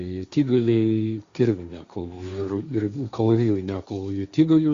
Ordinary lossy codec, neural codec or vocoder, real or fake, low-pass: Opus, 64 kbps; codec, 16 kHz, 1.1 kbps, Voila-Tokenizer; fake; 7.2 kHz